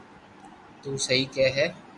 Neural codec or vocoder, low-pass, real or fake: none; 10.8 kHz; real